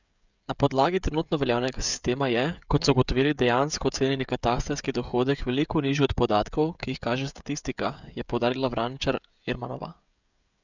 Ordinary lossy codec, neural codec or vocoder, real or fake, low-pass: none; codec, 16 kHz, 16 kbps, FreqCodec, smaller model; fake; 7.2 kHz